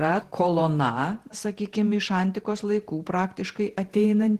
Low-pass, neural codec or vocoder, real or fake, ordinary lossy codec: 14.4 kHz; vocoder, 48 kHz, 128 mel bands, Vocos; fake; Opus, 16 kbps